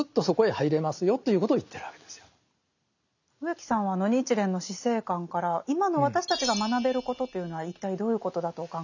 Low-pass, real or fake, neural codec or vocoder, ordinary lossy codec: 7.2 kHz; real; none; none